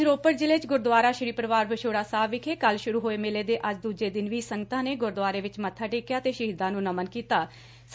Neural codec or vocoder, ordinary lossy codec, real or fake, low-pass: none; none; real; none